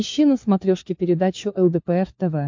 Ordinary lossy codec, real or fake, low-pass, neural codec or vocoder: MP3, 48 kbps; fake; 7.2 kHz; codec, 16 kHz, 4 kbps, FunCodec, trained on LibriTTS, 50 frames a second